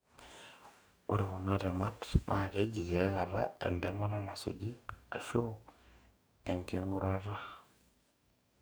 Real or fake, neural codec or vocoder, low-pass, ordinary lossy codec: fake; codec, 44.1 kHz, 2.6 kbps, DAC; none; none